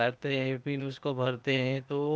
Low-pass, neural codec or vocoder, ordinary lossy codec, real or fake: none; codec, 16 kHz, 0.8 kbps, ZipCodec; none; fake